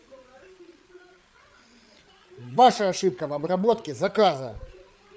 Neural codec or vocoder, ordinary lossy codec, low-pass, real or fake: codec, 16 kHz, 8 kbps, FreqCodec, larger model; none; none; fake